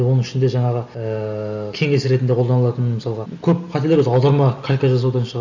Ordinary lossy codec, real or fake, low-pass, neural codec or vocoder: none; real; 7.2 kHz; none